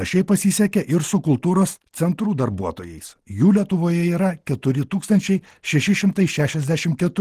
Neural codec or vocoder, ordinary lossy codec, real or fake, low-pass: none; Opus, 16 kbps; real; 14.4 kHz